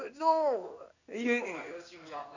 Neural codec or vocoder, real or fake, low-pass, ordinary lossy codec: codec, 16 kHz in and 24 kHz out, 1 kbps, XY-Tokenizer; fake; 7.2 kHz; none